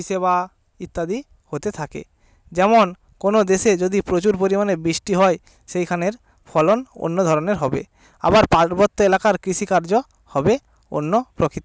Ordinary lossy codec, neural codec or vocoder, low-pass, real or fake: none; none; none; real